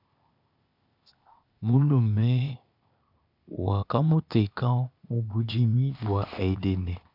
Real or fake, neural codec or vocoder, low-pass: fake; codec, 16 kHz, 0.8 kbps, ZipCodec; 5.4 kHz